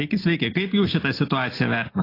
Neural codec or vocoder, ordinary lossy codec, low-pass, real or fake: none; AAC, 24 kbps; 5.4 kHz; real